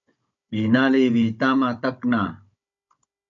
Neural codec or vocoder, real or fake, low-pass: codec, 16 kHz, 16 kbps, FunCodec, trained on Chinese and English, 50 frames a second; fake; 7.2 kHz